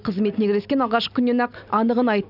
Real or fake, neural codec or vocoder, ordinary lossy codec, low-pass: real; none; none; 5.4 kHz